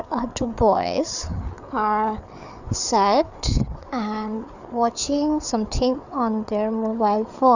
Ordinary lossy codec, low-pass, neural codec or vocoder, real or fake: none; 7.2 kHz; codec, 16 kHz, 4 kbps, FreqCodec, larger model; fake